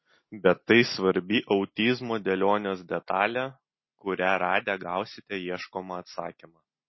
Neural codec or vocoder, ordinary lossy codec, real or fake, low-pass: none; MP3, 24 kbps; real; 7.2 kHz